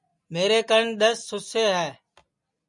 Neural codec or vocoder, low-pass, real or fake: none; 10.8 kHz; real